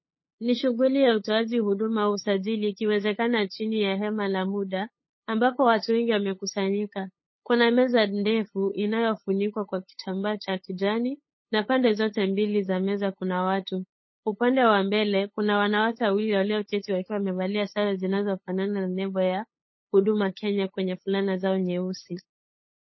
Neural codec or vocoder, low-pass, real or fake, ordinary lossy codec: codec, 16 kHz, 8 kbps, FunCodec, trained on LibriTTS, 25 frames a second; 7.2 kHz; fake; MP3, 24 kbps